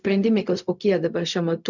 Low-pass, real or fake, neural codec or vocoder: 7.2 kHz; fake; codec, 16 kHz, 0.4 kbps, LongCat-Audio-Codec